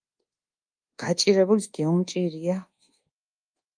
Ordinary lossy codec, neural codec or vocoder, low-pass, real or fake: Opus, 32 kbps; codec, 24 kHz, 1.2 kbps, DualCodec; 9.9 kHz; fake